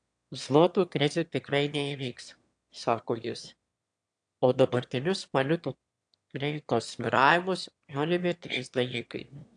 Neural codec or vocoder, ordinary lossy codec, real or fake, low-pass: autoencoder, 22.05 kHz, a latent of 192 numbers a frame, VITS, trained on one speaker; AAC, 64 kbps; fake; 9.9 kHz